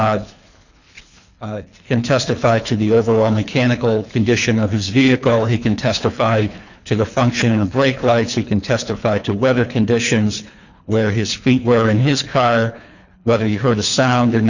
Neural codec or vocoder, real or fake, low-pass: codec, 24 kHz, 3 kbps, HILCodec; fake; 7.2 kHz